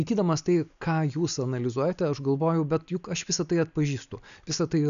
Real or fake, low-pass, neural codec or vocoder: real; 7.2 kHz; none